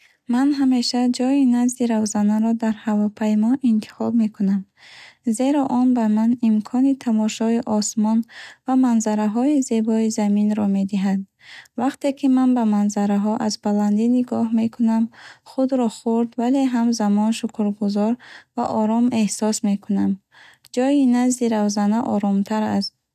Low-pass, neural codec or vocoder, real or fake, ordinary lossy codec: 14.4 kHz; none; real; none